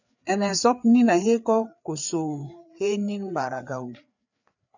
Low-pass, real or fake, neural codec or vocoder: 7.2 kHz; fake; codec, 16 kHz, 4 kbps, FreqCodec, larger model